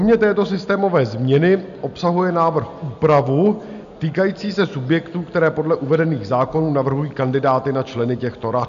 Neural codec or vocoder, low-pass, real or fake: none; 7.2 kHz; real